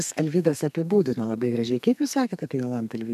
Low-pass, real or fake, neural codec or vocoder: 14.4 kHz; fake; codec, 32 kHz, 1.9 kbps, SNAC